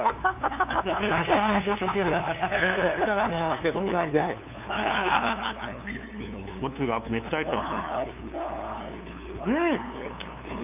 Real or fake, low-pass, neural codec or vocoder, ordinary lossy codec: fake; 3.6 kHz; codec, 16 kHz, 2 kbps, FunCodec, trained on LibriTTS, 25 frames a second; none